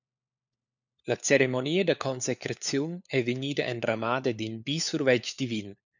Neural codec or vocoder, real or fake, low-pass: codec, 16 kHz, 4 kbps, FunCodec, trained on LibriTTS, 50 frames a second; fake; 7.2 kHz